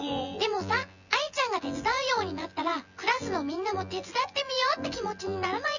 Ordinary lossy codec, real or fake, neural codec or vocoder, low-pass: none; fake; vocoder, 24 kHz, 100 mel bands, Vocos; 7.2 kHz